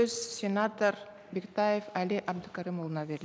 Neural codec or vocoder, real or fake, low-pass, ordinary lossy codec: none; real; none; none